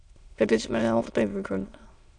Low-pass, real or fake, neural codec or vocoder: 9.9 kHz; fake; autoencoder, 22.05 kHz, a latent of 192 numbers a frame, VITS, trained on many speakers